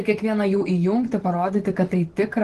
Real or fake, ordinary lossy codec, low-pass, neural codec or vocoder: fake; Opus, 16 kbps; 10.8 kHz; vocoder, 24 kHz, 100 mel bands, Vocos